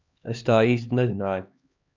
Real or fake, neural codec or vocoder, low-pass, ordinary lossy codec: fake; codec, 16 kHz, 2 kbps, X-Codec, HuBERT features, trained on LibriSpeech; 7.2 kHz; MP3, 64 kbps